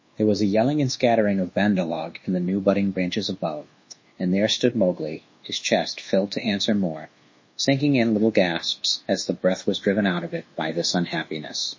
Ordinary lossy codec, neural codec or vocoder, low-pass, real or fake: MP3, 32 kbps; codec, 24 kHz, 1.2 kbps, DualCodec; 7.2 kHz; fake